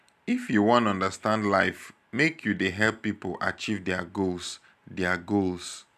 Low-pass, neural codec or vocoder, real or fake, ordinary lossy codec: 14.4 kHz; none; real; none